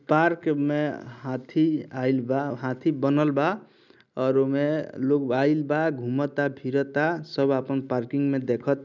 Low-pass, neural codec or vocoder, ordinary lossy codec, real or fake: 7.2 kHz; none; none; real